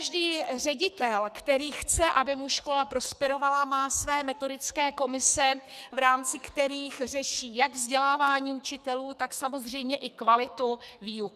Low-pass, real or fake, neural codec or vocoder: 14.4 kHz; fake; codec, 44.1 kHz, 2.6 kbps, SNAC